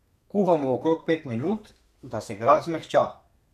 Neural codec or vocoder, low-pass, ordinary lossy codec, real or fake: codec, 32 kHz, 1.9 kbps, SNAC; 14.4 kHz; none; fake